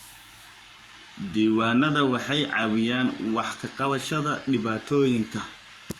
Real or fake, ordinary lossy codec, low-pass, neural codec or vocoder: fake; Opus, 64 kbps; 19.8 kHz; codec, 44.1 kHz, 7.8 kbps, Pupu-Codec